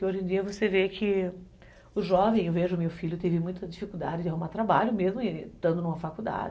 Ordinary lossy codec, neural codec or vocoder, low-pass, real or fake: none; none; none; real